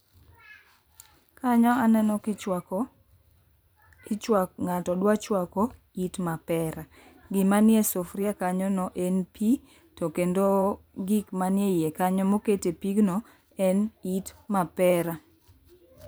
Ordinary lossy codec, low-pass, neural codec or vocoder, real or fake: none; none; vocoder, 44.1 kHz, 128 mel bands every 512 samples, BigVGAN v2; fake